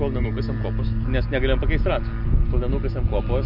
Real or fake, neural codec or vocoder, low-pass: real; none; 5.4 kHz